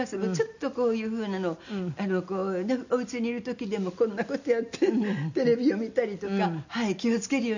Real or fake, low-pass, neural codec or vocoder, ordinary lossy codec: real; 7.2 kHz; none; none